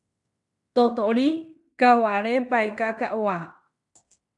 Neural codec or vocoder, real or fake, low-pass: codec, 16 kHz in and 24 kHz out, 0.9 kbps, LongCat-Audio-Codec, fine tuned four codebook decoder; fake; 10.8 kHz